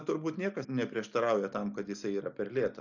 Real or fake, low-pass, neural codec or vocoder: real; 7.2 kHz; none